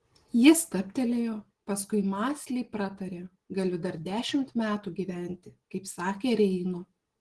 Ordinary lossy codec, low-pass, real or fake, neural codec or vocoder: Opus, 16 kbps; 10.8 kHz; real; none